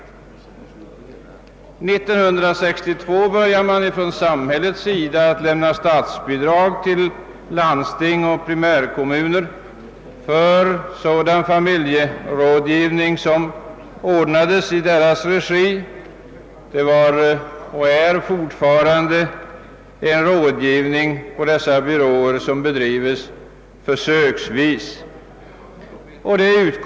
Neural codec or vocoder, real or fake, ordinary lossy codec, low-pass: none; real; none; none